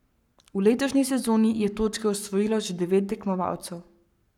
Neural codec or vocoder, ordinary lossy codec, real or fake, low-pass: codec, 44.1 kHz, 7.8 kbps, Pupu-Codec; none; fake; 19.8 kHz